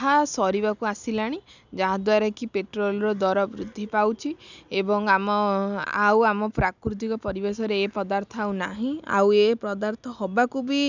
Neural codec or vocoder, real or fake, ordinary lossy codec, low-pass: none; real; none; 7.2 kHz